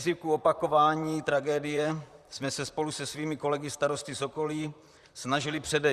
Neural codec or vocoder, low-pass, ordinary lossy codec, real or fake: vocoder, 44.1 kHz, 128 mel bands, Pupu-Vocoder; 14.4 kHz; Opus, 64 kbps; fake